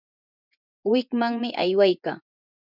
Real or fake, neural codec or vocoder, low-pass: fake; vocoder, 24 kHz, 100 mel bands, Vocos; 5.4 kHz